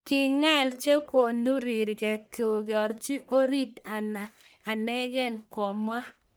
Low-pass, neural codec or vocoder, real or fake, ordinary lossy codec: none; codec, 44.1 kHz, 1.7 kbps, Pupu-Codec; fake; none